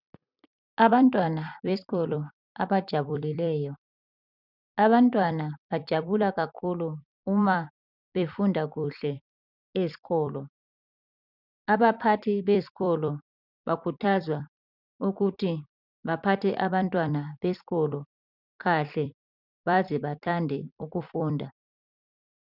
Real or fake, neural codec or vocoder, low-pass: fake; vocoder, 44.1 kHz, 128 mel bands every 256 samples, BigVGAN v2; 5.4 kHz